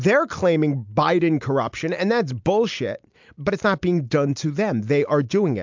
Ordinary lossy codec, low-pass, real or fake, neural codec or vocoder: MP3, 64 kbps; 7.2 kHz; real; none